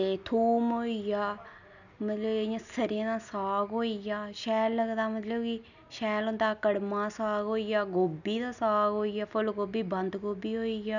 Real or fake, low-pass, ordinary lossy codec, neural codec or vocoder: real; 7.2 kHz; none; none